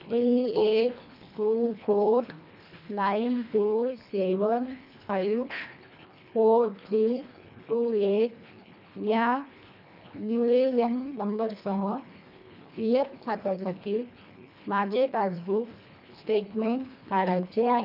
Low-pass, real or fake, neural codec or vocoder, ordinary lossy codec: 5.4 kHz; fake; codec, 24 kHz, 1.5 kbps, HILCodec; none